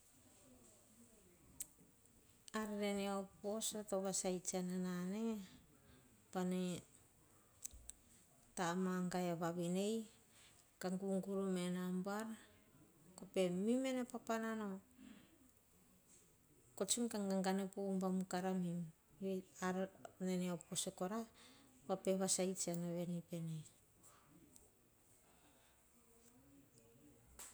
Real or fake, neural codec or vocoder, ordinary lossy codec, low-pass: real; none; none; none